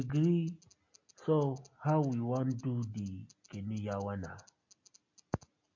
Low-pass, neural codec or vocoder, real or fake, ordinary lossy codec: 7.2 kHz; none; real; MP3, 48 kbps